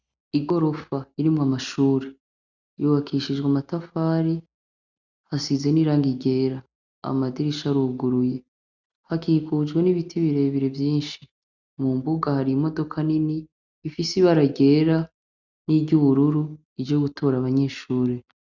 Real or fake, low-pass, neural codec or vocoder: real; 7.2 kHz; none